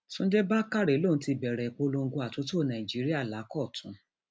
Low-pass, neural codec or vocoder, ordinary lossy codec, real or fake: none; none; none; real